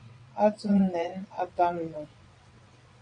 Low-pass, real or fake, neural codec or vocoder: 9.9 kHz; fake; vocoder, 22.05 kHz, 80 mel bands, WaveNeXt